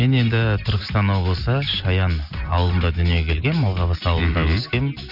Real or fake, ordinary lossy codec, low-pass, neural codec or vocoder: real; none; 5.4 kHz; none